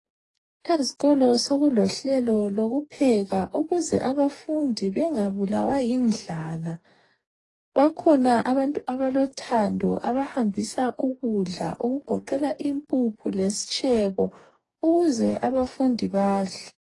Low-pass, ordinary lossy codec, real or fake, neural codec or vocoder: 10.8 kHz; AAC, 32 kbps; fake; codec, 44.1 kHz, 2.6 kbps, DAC